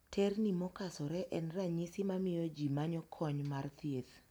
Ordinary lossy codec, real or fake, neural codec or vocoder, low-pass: none; real; none; none